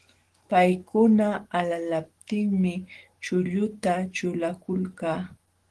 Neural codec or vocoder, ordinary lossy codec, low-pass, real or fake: autoencoder, 48 kHz, 128 numbers a frame, DAC-VAE, trained on Japanese speech; Opus, 16 kbps; 10.8 kHz; fake